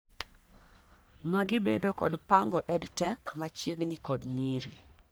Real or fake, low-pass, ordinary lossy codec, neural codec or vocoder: fake; none; none; codec, 44.1 kHz, 1.7 kbps, Pupu-Codec